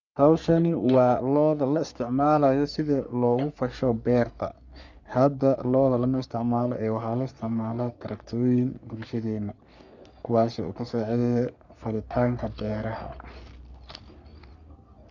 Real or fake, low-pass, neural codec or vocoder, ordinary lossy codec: fake; 7.2 kHz; codec, 44.1 kHz, 3.4 kbps, Pupu-Codec; none